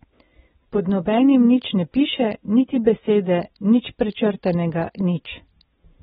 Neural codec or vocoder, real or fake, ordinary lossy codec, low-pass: none; real; AAC, 16 kbps; 19.8 kHz